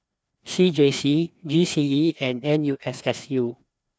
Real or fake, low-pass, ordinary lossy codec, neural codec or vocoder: fake; none; none; codec, 16 kHz, 2 kbps, FreqCodec, larger model